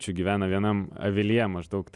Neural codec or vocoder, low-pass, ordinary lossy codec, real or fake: none; 10.8 kHz; Opus, 64 kbps; real